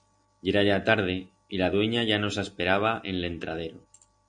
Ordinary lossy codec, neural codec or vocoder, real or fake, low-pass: MP3, 64 kbps; none; real; 9.9 kHz